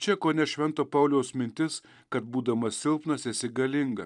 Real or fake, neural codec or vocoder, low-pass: fake; vocoder, 44.1 kHz, 128 mel bands every 256 samples, BigVGAN v2; 10.8 kHz